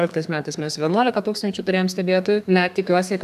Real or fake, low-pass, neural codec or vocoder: fake; 14.4 kHz; codec, 32 kHz, 1.9 kbps, SNAC